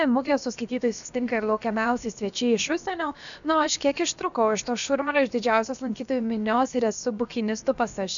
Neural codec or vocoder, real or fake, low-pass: codec, 16 kHz, about 1 kbps, DyCAST, with the encoder's durations; fake; 7.2 kHz